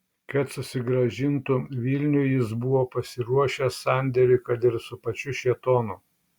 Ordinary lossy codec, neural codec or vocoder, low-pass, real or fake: Opus, 64 kbps; none; 19.8 kHz; real